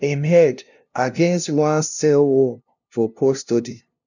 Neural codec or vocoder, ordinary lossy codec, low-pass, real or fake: codec, 16 kHz, 0.5 kbps, FunCodec, trained on LibriTTS, 25 frames a second; none; 7.2 kHz; fake